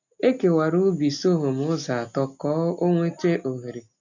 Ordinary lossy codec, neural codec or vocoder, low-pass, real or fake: none; none; 7.2 kHz; real